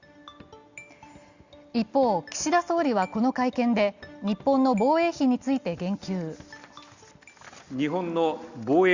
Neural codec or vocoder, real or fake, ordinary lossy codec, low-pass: none; real; Opus, 64 kbps; 7.2 kHz